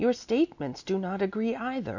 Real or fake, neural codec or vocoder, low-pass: real; none; 7.2 kHz